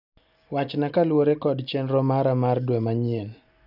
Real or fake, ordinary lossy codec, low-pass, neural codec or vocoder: real; none; 5.4 kHz; none